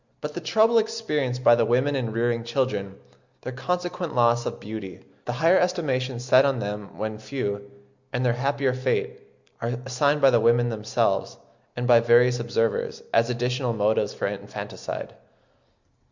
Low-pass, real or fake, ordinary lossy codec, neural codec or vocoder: 7.2 kHz; real; Opus, 64 kbps; none